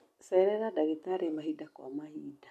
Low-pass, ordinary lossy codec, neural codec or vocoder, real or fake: 14.4 kHz; MP3, 64 kbps; none; real